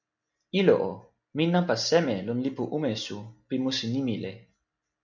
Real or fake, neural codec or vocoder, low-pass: real; none; 7.2 kHz